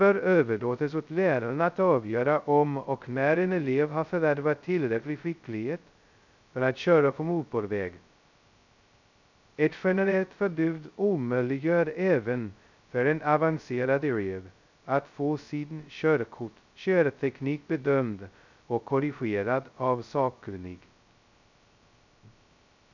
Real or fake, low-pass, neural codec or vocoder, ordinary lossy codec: fake; 7.2 kHz; codec, 16 kHz, 0.2 kbps, FocalCodec; none